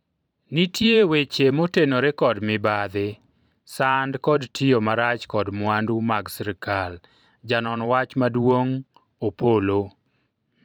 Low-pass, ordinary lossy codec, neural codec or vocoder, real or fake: 19.8 kHz; none; vocoder, 44.1 kHz, 128 mel bands every 512 samples, BigVGAN v2; fake